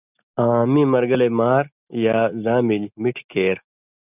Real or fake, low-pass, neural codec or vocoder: real; 3.6 kHz; none